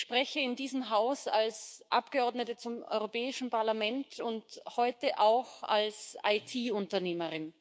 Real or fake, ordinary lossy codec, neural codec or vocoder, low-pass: fake; none; codec, 16 kHz, 6 kbps, DAC; none